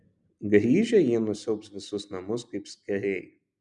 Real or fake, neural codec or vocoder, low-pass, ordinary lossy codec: real; none; 10.8 kHz; MP3, 96 kbps